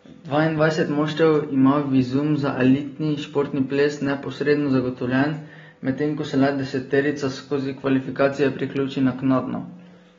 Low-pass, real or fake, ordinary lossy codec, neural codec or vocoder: 7.2 kHz; real; AAC, 24 kbps; none